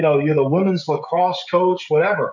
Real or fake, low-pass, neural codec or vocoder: fake; 7.2 kHz; codec, 16 kHz, 16 kbps, FreqCodec, smaller model